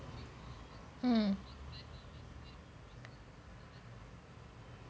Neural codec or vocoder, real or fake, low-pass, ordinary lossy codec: none; real; none; none